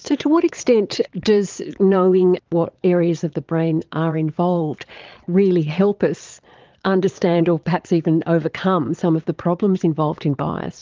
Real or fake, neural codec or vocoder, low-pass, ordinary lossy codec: fake; vocoder, 22.05 kHz, 80 mel bands, Vocos; 7.2 kHz; Opus, 24 kbps